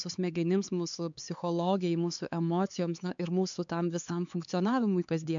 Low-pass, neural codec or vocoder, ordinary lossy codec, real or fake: 7.2 kHz; codec, 16 kHz, 4 kbps, X-Codec, HuBERT features, trained on LibriSpeech; MP3, 64 kbps; fake